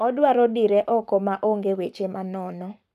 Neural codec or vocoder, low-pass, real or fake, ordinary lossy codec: codec, 44.1 kHz, 7.8 kbps, Pupu-Codec; 14.4 kHz; fake; none